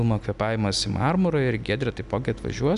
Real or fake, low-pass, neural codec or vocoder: real; 10.8 kHz; none